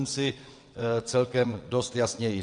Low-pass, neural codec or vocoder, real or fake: 9.9 kHz; vocoder, 22.05 kHz, 80 mel bands, WaveNeXt; fake